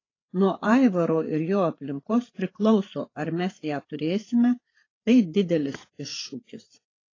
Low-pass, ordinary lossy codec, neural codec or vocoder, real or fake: 7.2 kHz; AAC, 32 kbps; codec, 16 kHz, 8 kbps, FreqCodec, larger model; fake